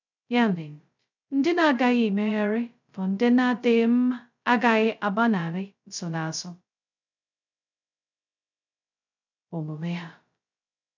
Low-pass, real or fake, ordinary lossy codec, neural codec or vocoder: 7.2 kHz; fake; none; codec, 16 kHz, 0.2 kbps, FocalCodec